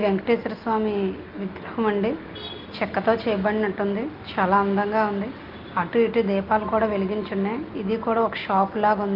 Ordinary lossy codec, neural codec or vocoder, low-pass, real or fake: Opus, 24 kbps; none; 5.4 kHz; real